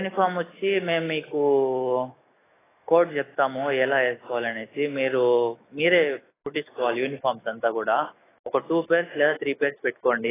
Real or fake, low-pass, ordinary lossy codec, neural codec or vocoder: fake; 3.6 kHz; AAC, 16 kbps; autoencoder, 48 kHz, 128 numbers a frame, DAC-VAE, trained on Japanese speech